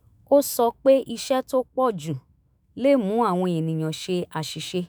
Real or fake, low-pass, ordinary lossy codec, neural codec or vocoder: fake; none; none; autoencoder, 48 kHz, 128 numbers a frame, DAC-VAE, trained on Japanese speech